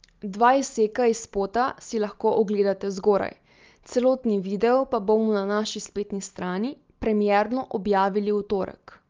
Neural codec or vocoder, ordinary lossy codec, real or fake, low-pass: none; Opus, 32 kbps; real; 7.2 kHz